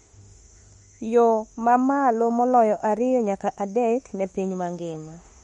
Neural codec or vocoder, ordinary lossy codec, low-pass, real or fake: autoencoder, 48 kHz, 32 numbers a frame, DAC-VAE, trained on Japanese speech; MP3, 48 kbps; 19.8 kHz; fake